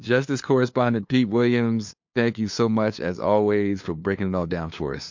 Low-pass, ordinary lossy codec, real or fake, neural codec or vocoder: 7.2 kHz; MP3, 48 kbps; fake; codec, 16 kHz, 2 kbps, FunCodec, trained on LibriTTS, 25 frames a second